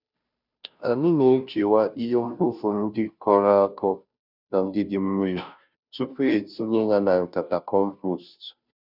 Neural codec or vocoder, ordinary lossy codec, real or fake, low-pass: codec, 16 kHz, 0.5 kbps, FunCodec, trained on Chinese and English, 25 frames a second; none; fake; 5.4 kHz